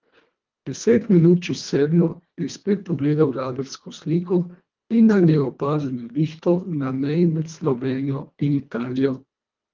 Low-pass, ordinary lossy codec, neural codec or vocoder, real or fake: 7.2 kHz; Opus, 24 kbps; codec, 24 kHz, 1.5 kbps, HILCodec; fake